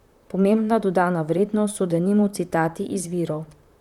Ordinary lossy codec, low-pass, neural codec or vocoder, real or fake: none; 19.8 kHz; vocoder, 44.1 kHz, 128 mel bands, Pupu-Vocoder; fake